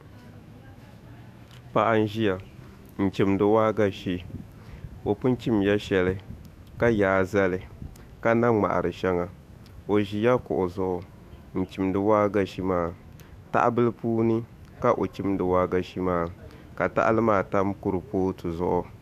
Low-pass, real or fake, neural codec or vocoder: 14.4 kHz; fake; autoencoder, 48 kHz, 128 numbers a frame, DAC-VAE, trained on Japanese speech